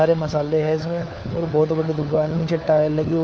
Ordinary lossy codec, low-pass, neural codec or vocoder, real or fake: none; none; codec, 16 kHz, 4 kbps, FunCodec, trained on LibriTTS, 50 frames a second; fake